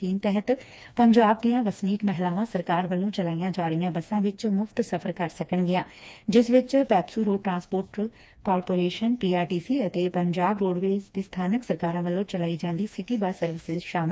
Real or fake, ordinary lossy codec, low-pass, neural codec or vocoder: fake; none; none; codec, 16 kHz, 2 kbps, FreqCodec, smaller model